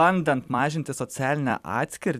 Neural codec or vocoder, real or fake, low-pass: vocoder, 44.1 kHz, 128 mel bands every 256 samples, BigVGAN v2; fake; 14.4 kHz